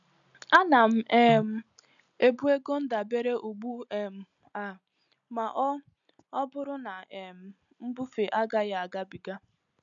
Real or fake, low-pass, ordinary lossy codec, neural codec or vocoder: real; 7.2 kHz; none; none